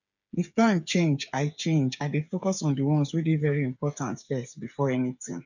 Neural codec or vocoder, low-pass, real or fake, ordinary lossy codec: codec, 16 kHz, 8 kbps, FreqCodec, smaller model; 7.2 kHz; fake; none